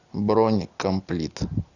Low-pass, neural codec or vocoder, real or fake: 7.2 kHz; none; real